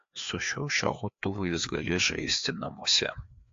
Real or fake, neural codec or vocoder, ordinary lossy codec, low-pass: fake; codec, 16 kHz, 2 kbps, FreqCodec, larger model; AAC, 48 kbps; 7.2 kHz